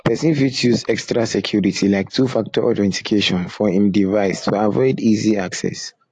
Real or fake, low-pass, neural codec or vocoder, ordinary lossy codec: real; 10.8 kHz; none; AAC, 48 kbps